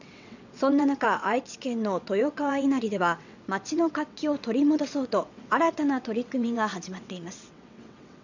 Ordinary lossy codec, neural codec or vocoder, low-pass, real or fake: none; vocoder, 22.05 kHz, 80 mel bands, WaveNeXt; 7.2 kHz; fake